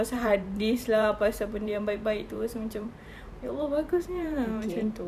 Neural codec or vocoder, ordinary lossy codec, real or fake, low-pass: vocoder, 44.1 kHz, 128 mel bands every 512 samples, BigVGAN v2; none; fake; 14.4 kHz